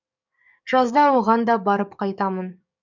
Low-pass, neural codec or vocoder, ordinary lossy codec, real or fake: 7.2 kHz; codec, 44.1 kHz, 7.8 kbps, DAC; none; fake